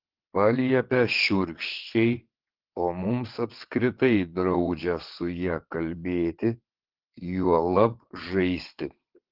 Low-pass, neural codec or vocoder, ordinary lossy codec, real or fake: 5.4 kHz; vocoder, 22.05 kHz, 80 mel bands, WaveNeXt; Opus, 16 kbps; fake